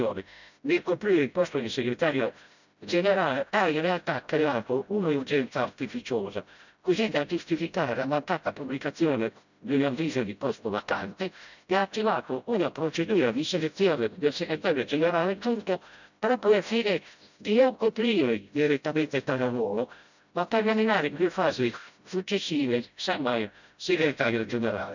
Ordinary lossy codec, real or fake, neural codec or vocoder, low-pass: none; fake; codec, 16 kHz, 0.5 kbps, FreqCodec, smaller model; 7.2 kHz